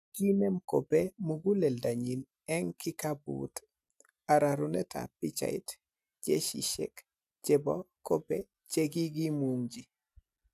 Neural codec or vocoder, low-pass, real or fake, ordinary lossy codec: none; 14.4 kHz; real; none